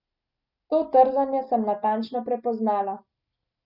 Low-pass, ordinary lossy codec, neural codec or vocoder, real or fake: 5.4 kHz; none; none; real